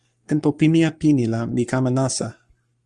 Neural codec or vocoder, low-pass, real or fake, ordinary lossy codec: codec, 44.1 kHz, 7.8 kbps, Pupu-Codec; 10.8 kHz; fake; Opus, 32 kbps